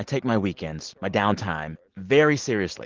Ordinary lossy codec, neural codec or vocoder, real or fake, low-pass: Opus, 16 kbps; none; real; 7.2 kHz